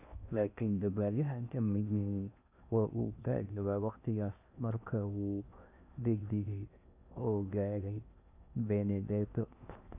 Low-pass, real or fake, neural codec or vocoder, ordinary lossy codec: 3.6 kHz; fake; codec, 16 kHz in and 24 kHz out, 0.6 kbps, FocalCodec, streaming, 4096 codes; none